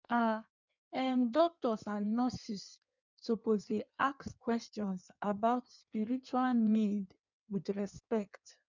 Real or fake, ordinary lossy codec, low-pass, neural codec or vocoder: fake; none; 7.2 kHz; codec, 16 kHz in and 24 kHz out, 1.1 kbps, FireRedTTS-2 codec